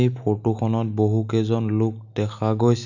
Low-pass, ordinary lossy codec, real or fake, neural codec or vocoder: 7.2 kHz; none; real; none